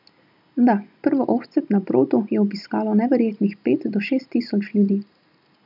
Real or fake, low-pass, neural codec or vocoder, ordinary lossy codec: real; 5.4 kHz; none; none